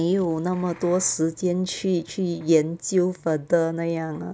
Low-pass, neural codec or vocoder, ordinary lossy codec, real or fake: none; none; none; real